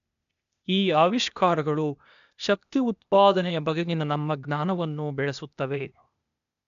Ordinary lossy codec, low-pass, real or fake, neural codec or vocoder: none; 7.2 kHz; fake; codec, 16 kHz, 0.8 kbps, ZipCodec